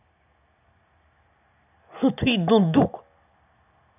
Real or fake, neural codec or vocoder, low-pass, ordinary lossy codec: real; none; 3.6 kHz; none